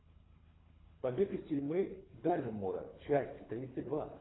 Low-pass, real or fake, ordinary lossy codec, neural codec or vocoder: 7.2 kHz; fake; AAC, 16 kbps; codec, 24 kHz, 3 kbps, HILCodec